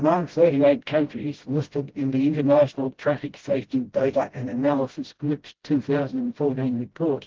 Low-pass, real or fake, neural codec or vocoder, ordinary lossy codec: 7.2 kHz; fake; codec, 16 kHz, 0.5 kbps, FreqCodec, smaller model; Opus, 16 kbps